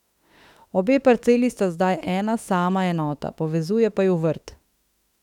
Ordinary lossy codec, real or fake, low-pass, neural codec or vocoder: none; fake; 19.8 kHz; autoencoder, 48 kHz, 32 numbers a frame, DAC-VAE, trained on Japanese speech